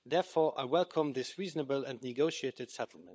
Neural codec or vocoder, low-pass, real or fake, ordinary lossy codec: codec, 16 kHz, 16 kbps, FunCodec, trained on LibriTTS, 50 frames a second; none; fake; none